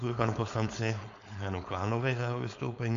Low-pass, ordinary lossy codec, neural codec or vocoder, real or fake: 7.2 kHz; MP3, 64 kbps; codec, 16 kHz, 4.8 kbps, FACodec; fake